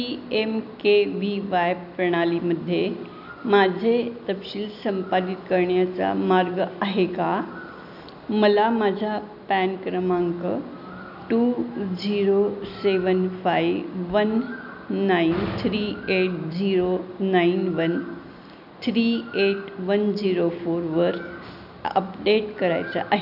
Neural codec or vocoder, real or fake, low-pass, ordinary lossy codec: none; real; 5.4 kHz; none